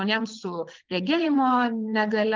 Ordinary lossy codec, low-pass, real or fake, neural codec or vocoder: Opus, 32 kbps; 7.2 kHz; fake; vocoder, 22.05 kHz, 80 mel bands, WaveNeXt